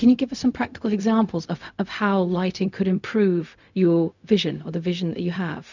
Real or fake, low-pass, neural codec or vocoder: fake; 7.2 kHz; codec, 16 kHz, 0.4 kbps, LongCat-Audio-Codec